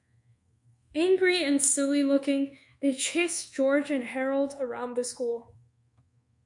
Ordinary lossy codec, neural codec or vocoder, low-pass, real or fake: MP3, 64 kbps; codec, 24 kHz, 1.2 kbps, DualCodec; 10.8 kHz; fake